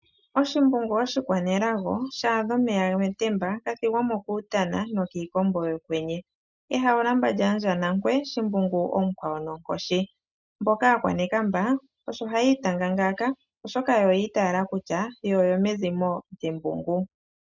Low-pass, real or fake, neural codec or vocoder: 7.2 kHz; real; none